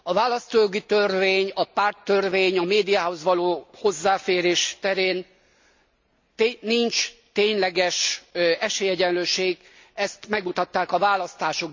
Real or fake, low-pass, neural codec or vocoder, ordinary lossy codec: real; 7.2 kHz; none; MP3, 64 kbps